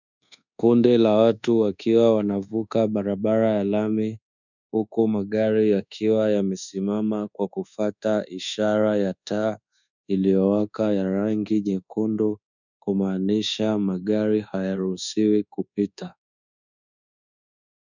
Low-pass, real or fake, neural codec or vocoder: 7.2 kHz; fake; codec, 24 kHz, 1.2 kbps, DualCodec